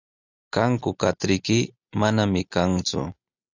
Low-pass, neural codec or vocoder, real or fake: 7.2 kHz; none; real